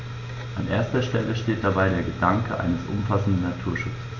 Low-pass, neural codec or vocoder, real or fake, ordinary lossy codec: 7.2 kHz; none; real; AAC, 48 kbps